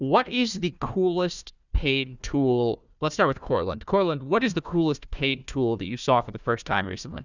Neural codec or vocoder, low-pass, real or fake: codec, 16 kHz, 1 kbps, FunCodec, trained on Chinese and English, 50 frames a second; 7.2 kHz; fake